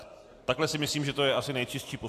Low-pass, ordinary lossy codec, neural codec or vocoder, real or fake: 14.4 kHz; AAC, 64 kbps; none; real